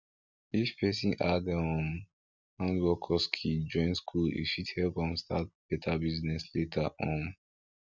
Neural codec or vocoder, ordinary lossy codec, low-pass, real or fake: none; none; 7.2 kHz; real